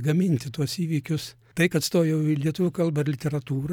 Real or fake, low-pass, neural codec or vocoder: fake; 19.8 kHz; vocoder, 44.1 kHz, 128 mel bands every 256 samples, BigVGAN v2